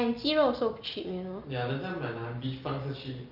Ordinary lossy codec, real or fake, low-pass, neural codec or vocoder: Opus, 24 kbps; real; 5.4 kHz; none